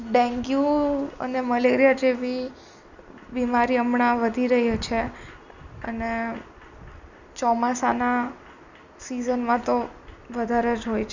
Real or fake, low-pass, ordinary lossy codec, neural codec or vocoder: real; 7.2 kHz; none; none